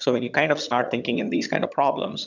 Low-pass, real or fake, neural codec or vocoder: 7.2 kHz; fake; vocoder, 22.05 kHz, 80 mel bands, HiFi-GAN